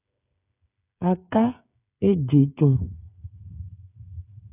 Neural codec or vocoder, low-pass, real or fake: codec, 16 kHz, 8 kbps, FreqCodec, smaller model; 3.6 kHz; fake